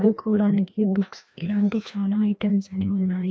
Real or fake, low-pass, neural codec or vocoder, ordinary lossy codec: fake; none; codec, 16 kHz, 1 kbps, FreqCodec, larger model; none